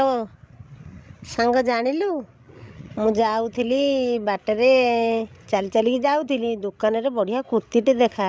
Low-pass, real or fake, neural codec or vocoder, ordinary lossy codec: none; fake; codec, 16 kHz, 16 kbps, FreqCodec, larger model; none